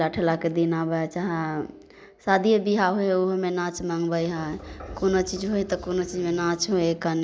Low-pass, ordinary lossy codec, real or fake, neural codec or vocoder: none; none; real; none